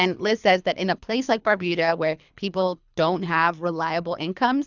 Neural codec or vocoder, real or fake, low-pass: codec, 24 kHz, 3 kbps, HILCodec; fake; 7.2 kHz